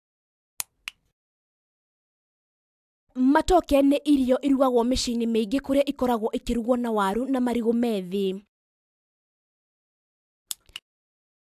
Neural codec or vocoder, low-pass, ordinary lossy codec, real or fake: none; 14.4 kHz; AAC, 96 kbps; real